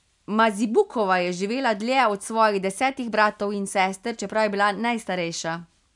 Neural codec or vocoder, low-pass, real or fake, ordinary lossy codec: none; 10.8 kHz; real; none